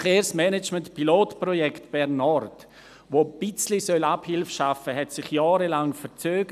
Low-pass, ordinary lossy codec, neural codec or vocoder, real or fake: 14.4 kHz; none; none; real